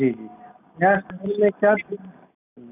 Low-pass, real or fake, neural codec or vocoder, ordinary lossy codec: 3.6 kHz; real; none; none